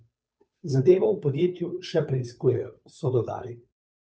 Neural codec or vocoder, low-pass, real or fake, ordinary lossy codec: codec, 16 kHz, 2 kbps, FunCodec, trained on Chinese and English, 25 frames a second; none; fake; none